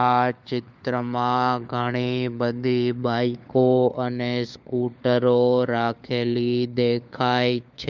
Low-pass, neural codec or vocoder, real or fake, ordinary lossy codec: none; codec, 16 kHz, 4 kbps, FunCodec, trained on LibriTTS, 50 frames a second; fake; none